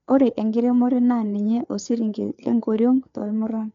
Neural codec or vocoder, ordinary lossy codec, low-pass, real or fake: codec, 16 kHz, 4 kbps, FreqCodec, larger model; MP3, 64 kbps; 7.2 kHz; fake